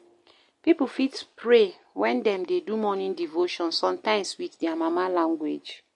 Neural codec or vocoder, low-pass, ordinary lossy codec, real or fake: none; 10.8 kHz; MP3, 48 kbps; real